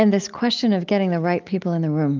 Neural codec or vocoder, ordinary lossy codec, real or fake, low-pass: none; Opus, 24 kbps; real; 7.2 kHz